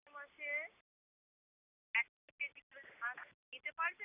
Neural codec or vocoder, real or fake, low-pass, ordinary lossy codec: none; real; 3.6 kHz; none